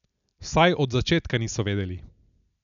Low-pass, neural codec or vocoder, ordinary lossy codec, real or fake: 7.2 kHz; none; none; real